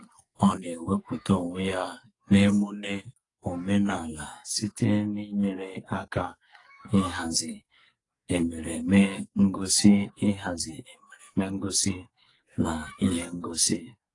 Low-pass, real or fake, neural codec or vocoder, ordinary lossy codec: 10.8 kHz; fake; codec, 44.1 kHz, 2.6 kbps, SNAC; AAC, 32 kbps